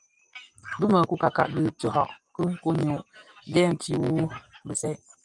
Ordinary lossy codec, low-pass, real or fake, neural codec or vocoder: Opus, 32 kbps; 10.8 kHz; real; none